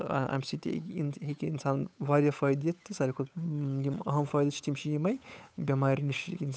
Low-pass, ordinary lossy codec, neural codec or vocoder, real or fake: none; none; codec, 16 kHz, 8 kbps, FunCodec, trained on Chinese and English, 25 frames a second; fake